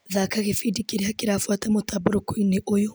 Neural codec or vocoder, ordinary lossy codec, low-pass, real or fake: none; none; none; real